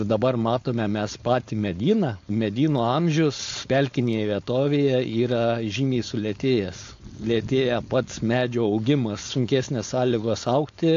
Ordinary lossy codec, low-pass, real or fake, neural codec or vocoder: AAC, 48 kbps; 7.2 kHz; fake; codec, 16 kHz, 4.8 kbps, FACodec